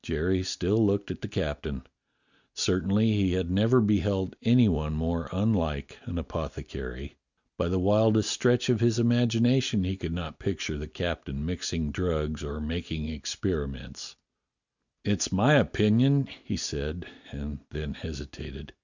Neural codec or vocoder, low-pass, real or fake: none; 7.2 kHz; real